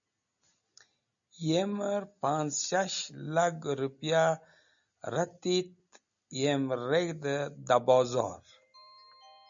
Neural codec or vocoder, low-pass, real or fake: none; 7.2 kHz; real